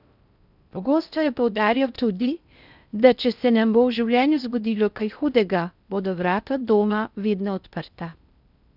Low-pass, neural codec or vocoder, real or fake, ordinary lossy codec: 5.4 kHz; codec, 16 kHz in and 24 kHz out, 0.6 kbps, FocalCodec, streaming, 4096 codes; fake; none